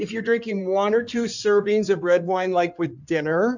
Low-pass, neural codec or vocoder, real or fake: 7.2 kHz; codec, 16 kHz in and 24 kHz out, 2.2 kbps, FireRedTTS-2 codec; fake